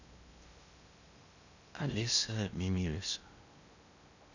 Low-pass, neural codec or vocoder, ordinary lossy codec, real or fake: 7.2 kHz; codec, 16 kHz in and 24 kHz out, 0.8 kbps, FocalCodec, streaming, 65536 codes; none; fake